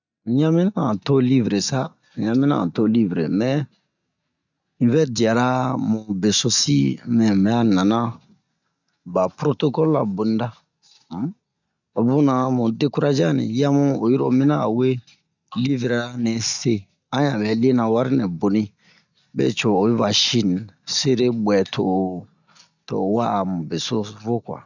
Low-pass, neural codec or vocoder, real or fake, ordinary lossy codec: 7.2 kHz; none; real; none